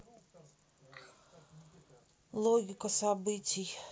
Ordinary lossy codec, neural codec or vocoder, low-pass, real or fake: none; none; none; real